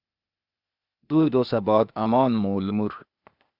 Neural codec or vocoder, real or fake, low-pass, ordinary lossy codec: codec, 16 kHz, 0.8 kbps, ZipCodec; fake; 5.4 kHz; Opus, 64 kbps